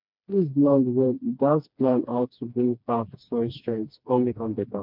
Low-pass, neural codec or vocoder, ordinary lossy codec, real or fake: 5.4 kHz; codec, 16 kHz, 2 kbps, FreqCodec, smaller model; MP3, 48 kbps; fake